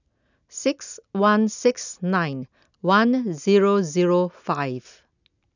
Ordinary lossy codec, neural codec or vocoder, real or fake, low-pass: none; none; real; 7.2 kHz